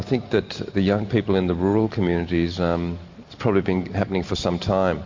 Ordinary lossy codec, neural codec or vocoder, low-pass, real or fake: MP3, 48 kbps; none; 7.2 kHz; real